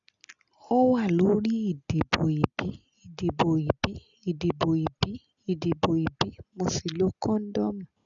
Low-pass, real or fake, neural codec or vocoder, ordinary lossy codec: 7.2 kHz; real; none; none